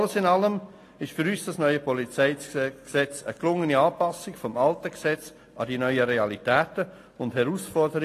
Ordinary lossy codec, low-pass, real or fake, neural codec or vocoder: AAC, 48 kbps; 14.4 kHz; real; none